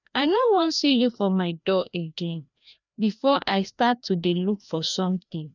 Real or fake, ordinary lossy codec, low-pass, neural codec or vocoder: fake; none; 7.2 kHz; codec, 16 kHz, 1 kbps, FreqCodec, larger model